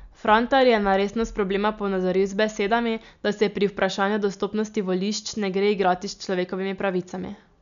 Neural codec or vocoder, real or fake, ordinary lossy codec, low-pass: none; real; MP3, 96 kbps; 7.2 kHz